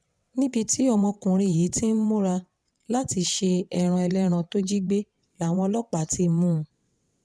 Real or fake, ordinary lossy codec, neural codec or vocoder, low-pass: fake; none; vocoder, 22.05 kHz, 80 mel bands, WaveNeXt; none